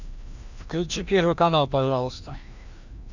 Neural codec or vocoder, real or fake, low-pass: codec, 16 kHz, 1 kbps, FreqCodec, larger model; fake; 7.2 kHz